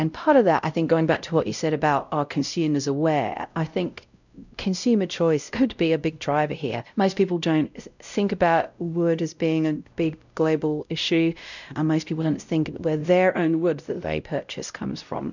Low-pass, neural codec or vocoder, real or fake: 7.2 kHz; codec, 16 kHz, 0.5 kbps, X-Codec, WavLM features, trained on Multilingual LibriSpeech; fake